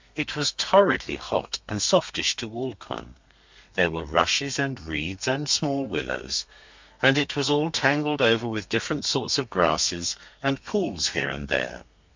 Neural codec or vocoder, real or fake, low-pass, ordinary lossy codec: codec, 32 kHz, 1.9 kbps, SNAC; fake; 7.2 kHz; MP3, 64 kbps